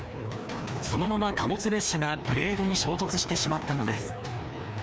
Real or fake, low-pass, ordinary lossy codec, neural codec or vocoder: fake; none; none; codec, 16 kHz, 2 kbps, FreqCodec, larger model